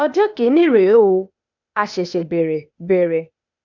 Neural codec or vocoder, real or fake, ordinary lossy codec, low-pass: codec, 16 kHz, 0.8 kbps, ZipCodec; fake; none; 7.2 kHz